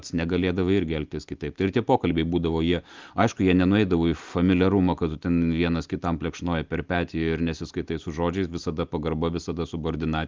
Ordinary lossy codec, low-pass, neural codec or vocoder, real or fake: Opus, 32 kbps; 7.2 kHz; none; real